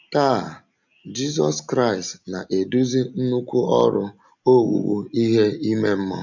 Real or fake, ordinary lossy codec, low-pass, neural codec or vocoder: real; none; 7.2 kHz; none